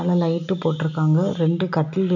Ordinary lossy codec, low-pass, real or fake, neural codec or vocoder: none; 7.2 kHz; real; none